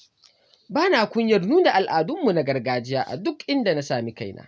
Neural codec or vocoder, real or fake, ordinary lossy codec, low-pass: none; real; none; none